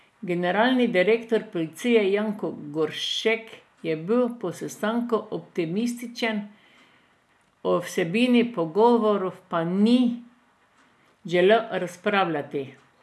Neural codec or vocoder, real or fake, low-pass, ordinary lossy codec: none; real; none; none